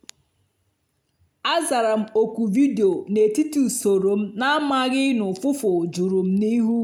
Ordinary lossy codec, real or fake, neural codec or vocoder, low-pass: none; real; none; 19.8 kHz